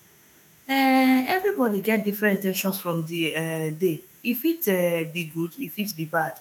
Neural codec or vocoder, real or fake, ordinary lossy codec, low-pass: autoencoder, 48 kHz, 32 numbers a frame, DAC-VAE, trained on Japanese speech; fake; none; none